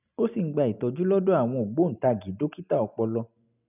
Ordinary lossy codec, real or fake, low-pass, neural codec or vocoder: none; real; 3.6 kHz; none